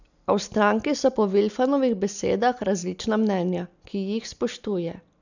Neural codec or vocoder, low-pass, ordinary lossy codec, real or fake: none; 7.2 kHz; none; real